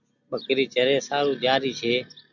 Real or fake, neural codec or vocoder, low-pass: real; none; 7.2 kHz